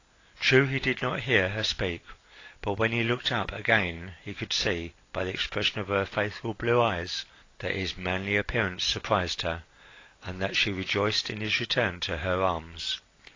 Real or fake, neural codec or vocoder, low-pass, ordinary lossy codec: real; none; 7.2 kHz; AAC, 32 kbps